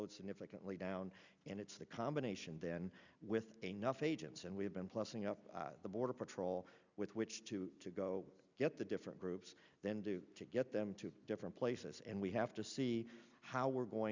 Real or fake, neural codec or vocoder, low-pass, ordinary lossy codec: real; none; 7.2 kHz; Opus, 64 kbps